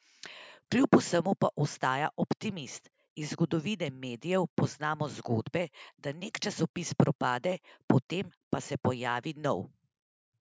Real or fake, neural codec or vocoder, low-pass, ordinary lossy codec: real; none; none; none